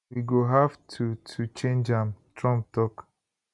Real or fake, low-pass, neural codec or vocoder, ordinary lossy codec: real; 10.8 kHz; none; none